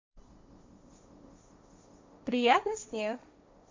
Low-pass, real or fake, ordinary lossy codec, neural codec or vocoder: 7.2 kHz; fake; MP3, 64 kbps; codec, 16 kHz, 1.1 kbps, Voila-Tokenizer